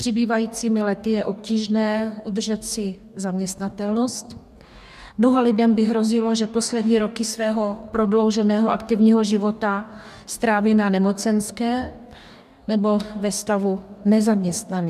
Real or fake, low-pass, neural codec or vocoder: fake; 14.4 kHz; codec, 44.1 kHz, 2.6 kbps, DAC